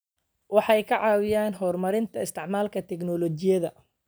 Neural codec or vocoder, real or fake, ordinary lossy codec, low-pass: none; real; none; none